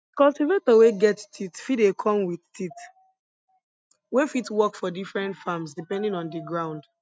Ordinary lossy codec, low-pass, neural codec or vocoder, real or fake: none; none; none; real